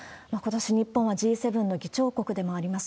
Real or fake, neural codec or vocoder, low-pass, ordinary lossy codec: real; none; none; none